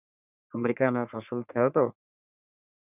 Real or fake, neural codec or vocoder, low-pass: fake; codec, 44.1 kHz, 3.4 kbps, Pupu-Codec; 3.6 kHz